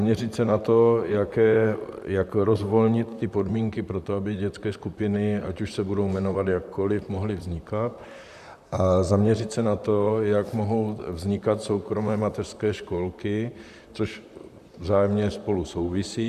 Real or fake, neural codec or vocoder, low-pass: fake; vocoder, 44.1 kHz, 128 mel bands, Pupu-Vocoder; 14.4 kHz